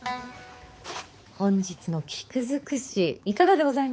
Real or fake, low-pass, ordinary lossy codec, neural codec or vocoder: fake; none; none; codec, 16 kHz, 4 kbps, X-Codec, HuBERT features, trained on balanced general audio